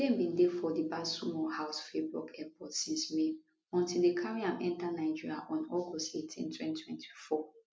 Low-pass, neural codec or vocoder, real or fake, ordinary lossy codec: none; none; real; none